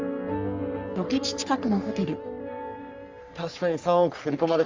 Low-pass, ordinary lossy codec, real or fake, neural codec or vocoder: 7.2 kHz; Opus, 32 kbps; fake; codec, 44.1 kHz, 3.4 kbps, Pupu-Codec